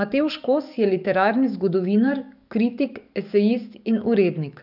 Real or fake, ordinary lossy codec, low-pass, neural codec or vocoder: fake; none; 5.4 kHz; codec, 44.1 kHz, 7.8 kbps, DAC